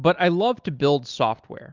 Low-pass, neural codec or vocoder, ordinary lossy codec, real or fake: 7.2 kHz; none; Opus, 24 kbps; real